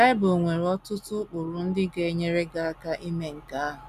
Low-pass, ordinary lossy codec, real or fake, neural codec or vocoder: 14.4 kHz; none; real; none